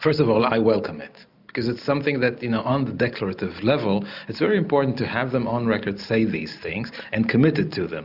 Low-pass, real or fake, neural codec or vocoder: 5.4 kHz; real; none